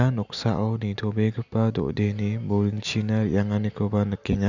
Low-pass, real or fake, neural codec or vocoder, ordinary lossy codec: 7.2 kHz; real; none; none